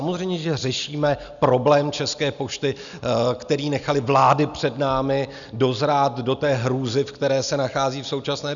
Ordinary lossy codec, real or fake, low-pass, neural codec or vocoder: MP3, 96 kbps; real; 7.2 kHz; none